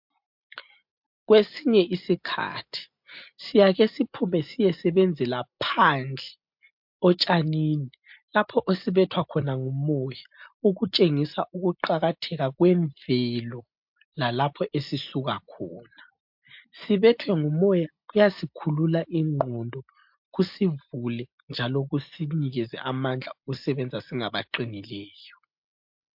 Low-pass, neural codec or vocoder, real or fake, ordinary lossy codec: 5.4 kHz; none; real; MP3, 48 kbps